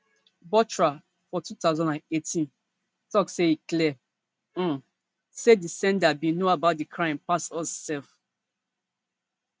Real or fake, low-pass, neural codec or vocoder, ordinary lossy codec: real; none; none; none